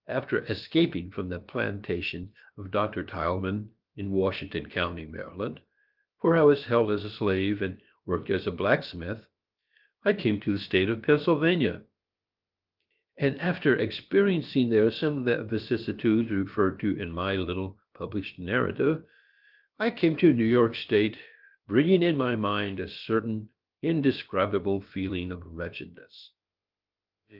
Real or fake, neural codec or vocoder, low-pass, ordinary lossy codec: fake; codec, 16 kHz, about 1 kbps, DyCAST, with the encoder's durations; 5.4 kHz; Opus, 32 kbps